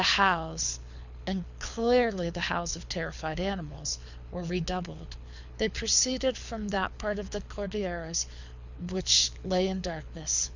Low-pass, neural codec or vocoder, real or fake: 7.2 kHz; codec, 24 kHz, 6 kbps, HILCodec; fake